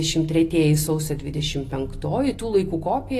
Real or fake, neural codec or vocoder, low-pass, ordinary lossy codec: real; none; 14.4 kHz; AAC, 48 kbps